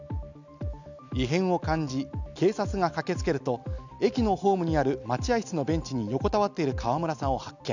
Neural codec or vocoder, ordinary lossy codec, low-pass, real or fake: none; none; 7.2 kHz; real